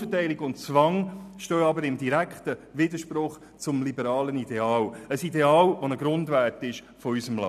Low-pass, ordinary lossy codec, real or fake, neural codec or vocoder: 14.4 kHz; none; fake; vocoder, 44.1 kHz, 128 mel bands every 256 samples, BigVGAN v2